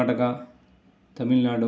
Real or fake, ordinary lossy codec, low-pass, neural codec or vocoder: real; none; none; none